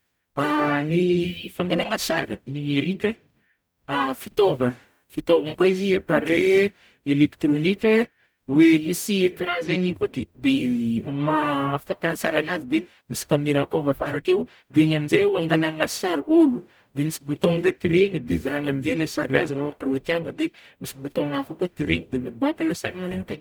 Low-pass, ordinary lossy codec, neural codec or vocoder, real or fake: none; none; codec, 44.1 kHz, 0.9 kbps, DAC; fake